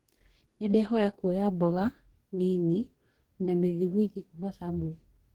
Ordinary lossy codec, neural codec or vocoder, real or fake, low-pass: Opus, 16 kbps; codec, 44.1 kHz, 2.6 kbps, DAC; fake; 19.8 kHz